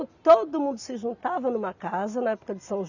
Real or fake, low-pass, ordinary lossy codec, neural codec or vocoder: real; 7.2 kHz; none; none